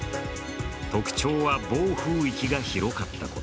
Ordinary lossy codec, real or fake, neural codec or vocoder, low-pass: none; real; none; none